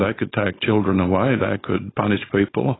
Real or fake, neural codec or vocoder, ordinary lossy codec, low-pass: fake; codec, 16 kHz, 4.8 kbps, FACodec; AAC, 16 kbps; 7.2 kHz